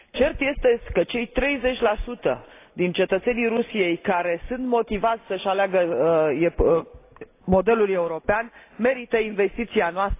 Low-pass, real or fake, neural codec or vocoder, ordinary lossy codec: 3.6 kHz; real; none; AAC, 24 kbps